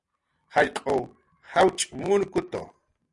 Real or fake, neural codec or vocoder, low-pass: real; none; 10.8 kHz